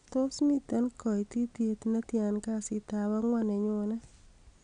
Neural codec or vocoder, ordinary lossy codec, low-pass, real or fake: none; none; 9.9 kHz; real